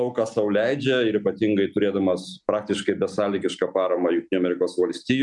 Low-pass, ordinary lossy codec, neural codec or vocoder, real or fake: 10.8 kHz; MP3, 96 kbps; none; real